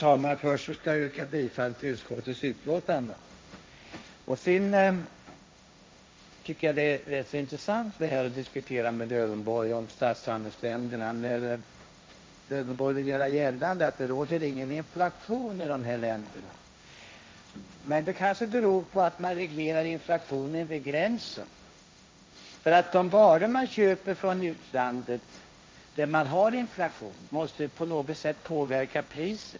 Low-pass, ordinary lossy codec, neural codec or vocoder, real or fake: none; none; codec, 16 kHz, 1.1 kbps, Voila-Tokenizer; fake